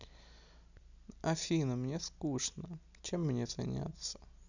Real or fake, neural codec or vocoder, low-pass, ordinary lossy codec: real; none; 7.2 kHz; none